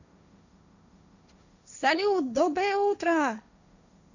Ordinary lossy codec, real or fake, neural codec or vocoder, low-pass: none; fake; codec, 16 kHz, 1.1 kbps, Voila-Tokenizer; 7.2 kHz